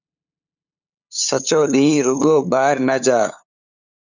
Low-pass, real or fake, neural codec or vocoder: 7.2 kHz; fake; codec, 16 kHz, 8 kbps, FunCodec, trained on LibriTTS, 25 frames a second